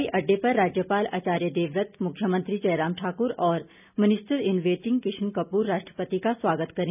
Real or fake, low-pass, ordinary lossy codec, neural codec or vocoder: real; 3.6 kHz; none; none